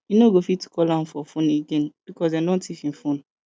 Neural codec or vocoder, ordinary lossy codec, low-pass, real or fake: none; none; none; real